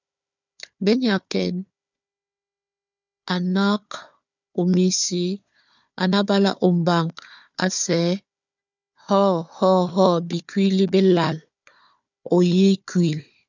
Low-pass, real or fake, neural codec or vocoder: 7.2 kHz; fake; codec, 16 kHz, 4 kbps, FunCodec, trained on Chinese and English, 50 frames a second